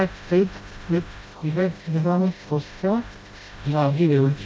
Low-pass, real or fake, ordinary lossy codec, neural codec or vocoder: none; fake; none; codec, 16 kHz, 0.5 kbps, FreqCodec, smaller model